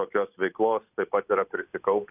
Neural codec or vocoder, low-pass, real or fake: none; 3.6 kHz; real